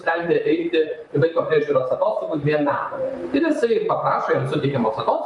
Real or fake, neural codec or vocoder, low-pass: fake; vocoder, 44.1 kHz, 128 mel bands, Pupu-Vocoder; 10.8 kHz